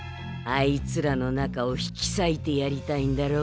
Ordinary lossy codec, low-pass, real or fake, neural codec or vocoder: none; none; real; none